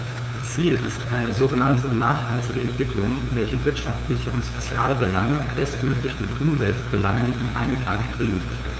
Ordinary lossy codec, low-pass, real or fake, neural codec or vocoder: none; none; fake; codec, 16 kHz, 2 kbps, FunCodec, trained on LibriTTS, 25 frames a second